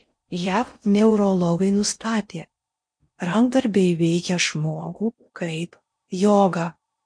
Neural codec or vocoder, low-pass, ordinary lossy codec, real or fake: codec, 16 kHz in and 24 kHz out, 0.6 kbps, FocalCodec, streaming, 4096 codes; 9.9 kHz; MP3, 48 kbps; fake